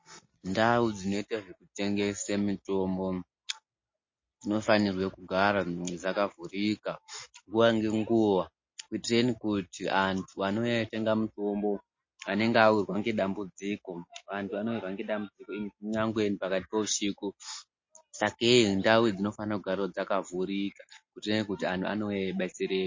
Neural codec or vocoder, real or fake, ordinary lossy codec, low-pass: none; real; MP3, 32 kbps; 7.2 kHz